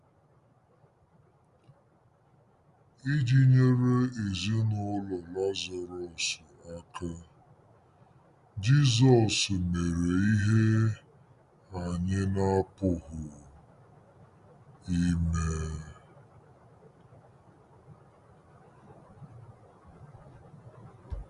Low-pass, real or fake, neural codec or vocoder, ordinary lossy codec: 10.8 kHz; real; none; Opus, 64 kbps